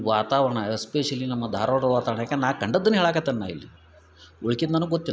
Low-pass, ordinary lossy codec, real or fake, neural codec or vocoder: none; none; real; none